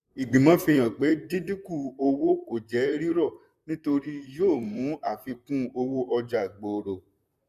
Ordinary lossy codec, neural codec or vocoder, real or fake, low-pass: none; vocoder, 44.1 kHz, 128 mel bands, Pupu-Vocoder; fake; 14.4 kHz